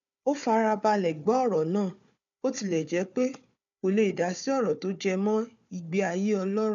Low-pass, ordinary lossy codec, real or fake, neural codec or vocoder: 7.2 kHz; none; fake; codec, 16 kHz, 4 kbps, FunCodec, trained on Chinese and English, 50 frames a second